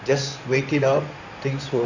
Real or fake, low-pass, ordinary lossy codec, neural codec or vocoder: fake; 7.2 kHz; none; codec, 16 kHz in and 24 kHz out, 2.2 kbps, FireRedTTS-2 codec